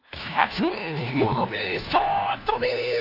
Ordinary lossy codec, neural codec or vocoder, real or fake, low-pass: AAC, 32 kbps; codec, 16 kHz, 1 kbps, FunCodec, trained on LibriTTS, 50 frames a second; fake; 5.4 kHz